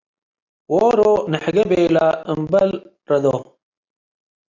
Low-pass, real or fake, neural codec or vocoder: 7.2 kHz; real; none